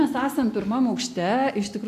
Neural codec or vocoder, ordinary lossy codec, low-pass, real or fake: none; AAC, 64 kbps; 14.4 kHz; real